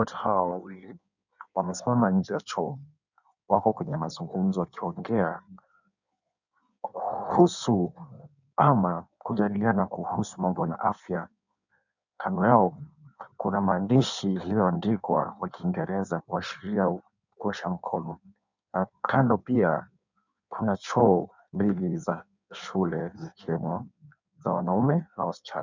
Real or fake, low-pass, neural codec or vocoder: fake; 7.2 kHz; codec, 16 kHz in and 24 kHz out, 1.1 kbps, FireRedTTS-2 codec